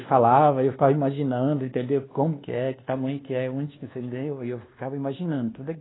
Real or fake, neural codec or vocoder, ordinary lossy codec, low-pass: fake; codec, 24 kHz, 1.2 kbps, DualCodec; AAC, 16 kbps; 7.2 kHz